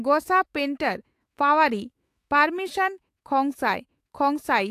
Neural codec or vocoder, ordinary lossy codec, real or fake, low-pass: autoencoder, 48 kHz, 128 numbers a frame, DAC-VAE, trained on Japanese speech; AAC, 64 kbps; fake; 14.4 kHz